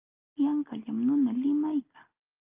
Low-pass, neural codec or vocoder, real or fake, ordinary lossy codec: 3.6 kHz; none; real; Opus, 24 kbps